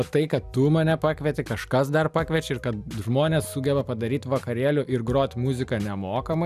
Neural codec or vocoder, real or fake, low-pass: none; real; 14.4 kHz